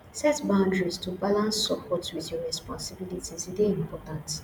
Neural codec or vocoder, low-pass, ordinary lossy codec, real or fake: vocoder, 48 kHz, 128 mel bands, Vocos; none; none; fake